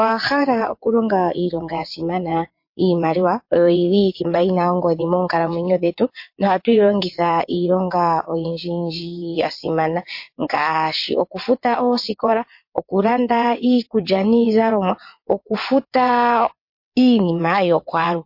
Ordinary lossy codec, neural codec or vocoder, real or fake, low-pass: MP3, 32 kbps; vocoder, 22.05 kHz, 80 mel bands, WaveNeXt; fake; 5.4 kHz